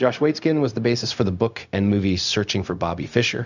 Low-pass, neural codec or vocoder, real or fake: 7.2 kHz; codec, 16 kHz, 0.4 kbps, LongCat-Audio-Codec; fake